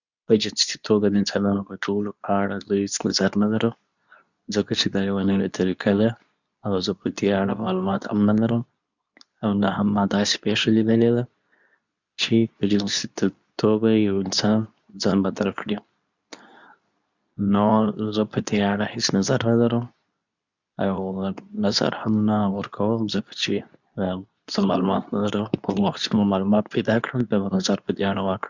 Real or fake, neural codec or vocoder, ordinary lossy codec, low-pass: fake; codec, 24 kHz, 0.9 kbps, WavTokenizer, medium speech release version 2; none; 7.2 kHz